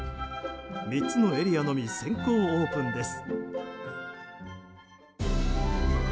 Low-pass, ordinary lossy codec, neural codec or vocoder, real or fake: none; none; none; real